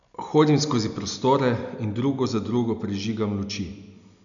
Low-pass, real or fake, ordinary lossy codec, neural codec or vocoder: 7.2 kHz; real; none; none